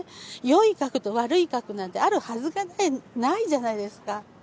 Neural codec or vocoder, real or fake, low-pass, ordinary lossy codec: none; real; none; none